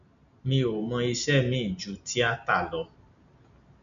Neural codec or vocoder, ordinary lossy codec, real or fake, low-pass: none; none; real; 7.2 kHz